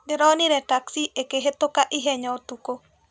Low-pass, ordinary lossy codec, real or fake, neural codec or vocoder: none; none; real; none